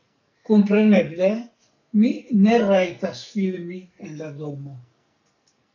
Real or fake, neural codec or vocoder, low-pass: fake; codec, 44.1 kHz, 2.6 kbps, SNAC; 7.2 kHz